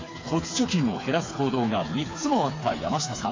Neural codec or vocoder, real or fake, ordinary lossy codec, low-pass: codec, 16 kHz, 4 kbps, FreqCodec, smaller model; fake; AAC, 32 kbps; 7.2 kHz